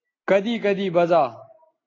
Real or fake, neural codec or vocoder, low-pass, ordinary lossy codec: real; none; 7.2 kHz; AAC, 32 kbps